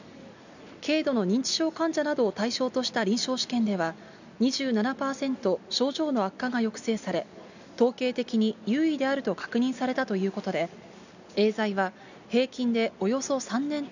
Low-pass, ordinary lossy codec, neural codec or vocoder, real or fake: 7.2 kHz; none; none; real